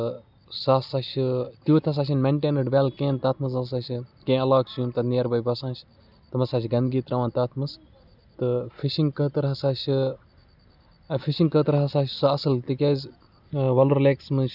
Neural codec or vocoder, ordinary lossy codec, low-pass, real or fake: none; none; 5.4 kHz; real